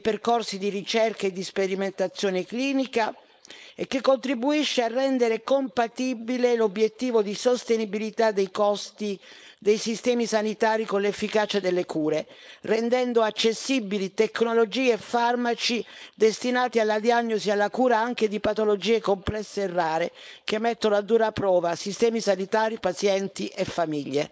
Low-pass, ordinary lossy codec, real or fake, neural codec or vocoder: none; none; fake; codec, 16 kHz, 4.8 kbps, FACodec